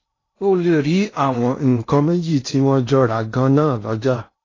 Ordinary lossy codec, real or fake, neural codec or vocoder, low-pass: AAC, 32 kbps; fake; codec, 16 kHz in and 24 kHz out, 0.6 kbps, FocalCodec, streaming, 2048 codes; 7.2 kHz